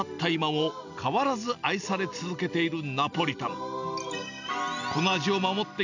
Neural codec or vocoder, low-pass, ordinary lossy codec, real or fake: none; 7.2 kHz; none; real